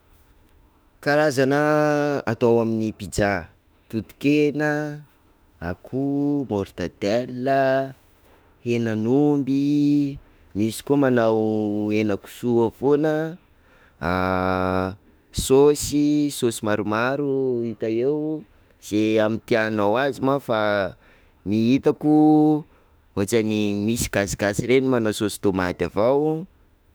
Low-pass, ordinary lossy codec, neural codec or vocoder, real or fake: none; none; autoencoder, 48 kHz, 32 numbers a frame, DAC-VAE, trained on Japanese speech; fake